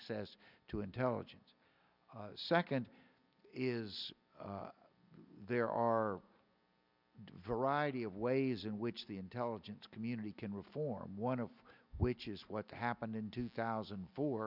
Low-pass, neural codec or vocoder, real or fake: 5.4 kHz; none; real